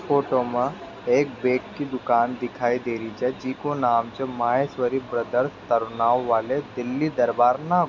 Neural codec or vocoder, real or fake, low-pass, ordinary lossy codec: none; real; 7.2 kHz; none